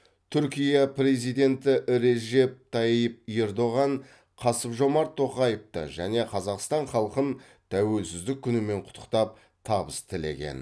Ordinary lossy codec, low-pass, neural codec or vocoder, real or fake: none; none; none; real